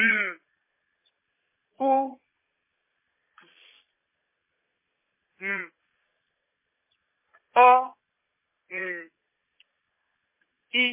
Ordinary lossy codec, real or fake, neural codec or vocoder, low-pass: MP3, 16 kbps; fake; vocoder, 22.05 kHz, 80 mel bands, Vocos; 3.6 kHz